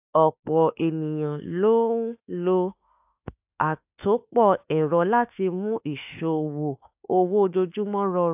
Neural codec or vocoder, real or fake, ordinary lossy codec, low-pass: autoencoder, 48 kHz, 32 numbers a frame, DAC-VAE, trained on Japanese speech; fake; AAC, 32 kbps; 3.6 kHz